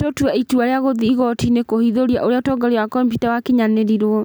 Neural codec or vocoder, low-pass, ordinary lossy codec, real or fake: none; none; none; real